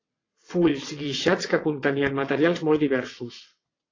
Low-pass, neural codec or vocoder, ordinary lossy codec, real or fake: 7.2 kHz; vocoder, 22.05 kHz, 80 mel bands, WaveNeXt; AAC, 32 kbps; fake